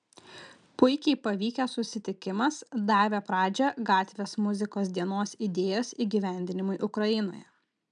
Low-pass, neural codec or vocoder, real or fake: 9.9 kHz; none; real